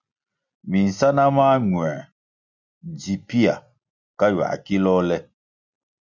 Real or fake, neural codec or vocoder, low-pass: real; none; 7.2 kHz